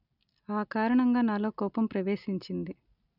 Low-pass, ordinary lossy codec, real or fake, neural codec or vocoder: 5.4 kHz; none; real; none